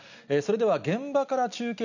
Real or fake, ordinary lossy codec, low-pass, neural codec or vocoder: real; none; 7.2 kHz; none